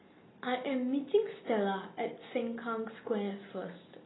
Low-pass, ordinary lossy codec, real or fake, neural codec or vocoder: 7.2 kHz; AAC, 16 kbps; real; none